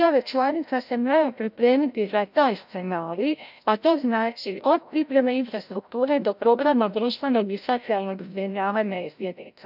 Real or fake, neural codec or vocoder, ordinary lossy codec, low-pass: fake; codec, 16 kHz, 0.5 kbps, FreqCodec, larger model; none; 5.4 kHz